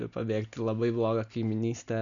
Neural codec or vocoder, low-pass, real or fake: none; 7.2 kHz; real